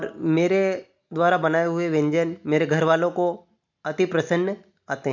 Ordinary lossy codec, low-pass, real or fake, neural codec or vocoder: none; 7.2 kHz; real; none